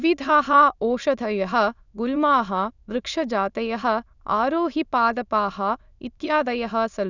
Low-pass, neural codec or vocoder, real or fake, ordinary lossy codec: 7.2 kHz; autoencoder, 22.05 kHz, a latent of 192 numbers a frame, VITS, trained on many speakers; fake; none